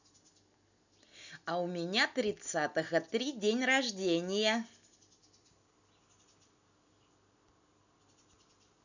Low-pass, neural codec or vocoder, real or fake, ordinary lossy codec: 7.2 kHz; none; real; none